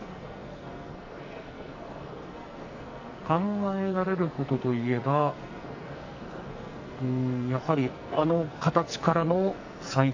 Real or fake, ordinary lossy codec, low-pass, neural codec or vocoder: fake; AAC, 48 kbps; 7.2 kHz; codec, 44.1 kHz, 2.6 kbps, SNAC